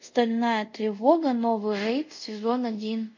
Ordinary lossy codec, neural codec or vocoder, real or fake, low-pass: MP3, 48 kbps; codec, 24 kHz, 0.5 kbps, DualCodec; fake; 7.2 kHz